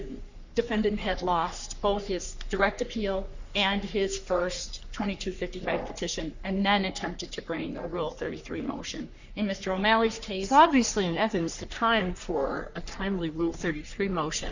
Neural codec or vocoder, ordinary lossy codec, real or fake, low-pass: codec, 44.1 kHz, 3.4 kbps, Pupu-Codec; Opus, 64 kbps; fake; 7.2 kHz